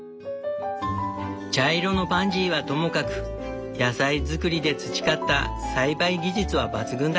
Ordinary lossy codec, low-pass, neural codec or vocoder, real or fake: none; none; none; real